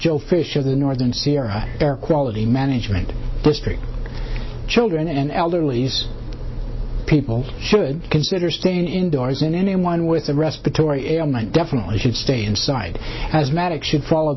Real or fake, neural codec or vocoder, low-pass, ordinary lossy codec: real; none; 7.2 kHz; MP3, 24 kbps